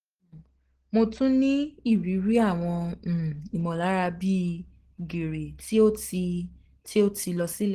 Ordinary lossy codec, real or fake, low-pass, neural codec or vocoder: Opus, 16 kbps; real; 14.4 kHz; none